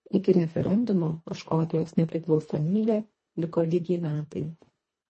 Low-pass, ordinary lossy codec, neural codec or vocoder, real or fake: 10.8 kHz; MP3, 32 kbps; codec, 24 kHz, 1.5 kbps, HILCodec; fake